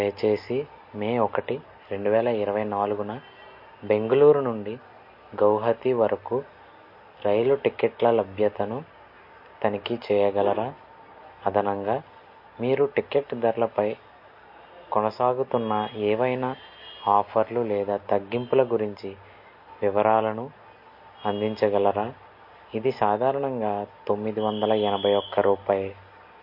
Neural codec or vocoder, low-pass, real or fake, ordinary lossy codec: none; 5.4 kHz; real; MP3, 32 kbps